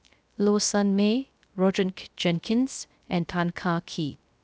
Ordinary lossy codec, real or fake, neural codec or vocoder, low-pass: none; fake; codec, 16 kHz, 0.3 kbps, FocalCodec; none